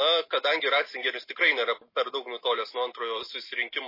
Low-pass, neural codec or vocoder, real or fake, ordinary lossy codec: 5.4 kHz; none; real; MP3, 24 kbps